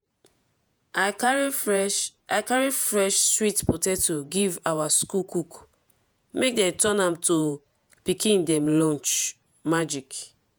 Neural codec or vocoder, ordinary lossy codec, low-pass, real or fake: vocoder, 48 kHz, 128 mel bands, Vocos; none; none; fake